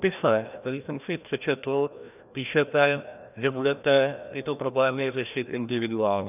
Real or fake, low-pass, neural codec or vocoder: fake; 3.6 kHz; codec, 16 kHz, 1 kbps, FreqCodec, larger model